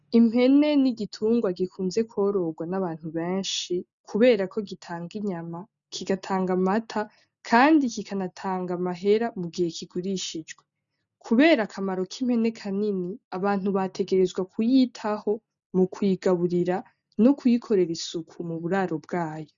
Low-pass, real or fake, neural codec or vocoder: 7.2 kHz; real; none